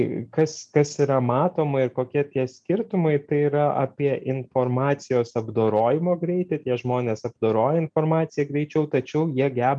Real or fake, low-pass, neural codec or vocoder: real; 9.9 kHz; none